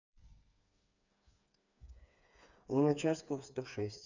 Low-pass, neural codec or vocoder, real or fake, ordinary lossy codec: 7.2 kHz; codec, 16 kHz, 4 kbps, FreqCodec, smaller model; fake; none